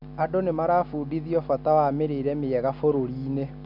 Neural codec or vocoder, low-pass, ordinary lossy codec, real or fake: none; 5.4 kHz; none; real